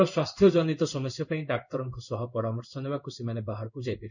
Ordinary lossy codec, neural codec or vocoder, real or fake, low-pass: none; codec, 16 kHz in and 24 kHz out, 1 kbps, XY-Tokenizer; fake; 7.2 kHz